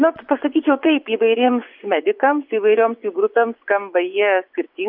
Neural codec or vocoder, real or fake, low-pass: vocoder, 24 kHz, 100 mel bands, Vocos; fake; 5.4 kHz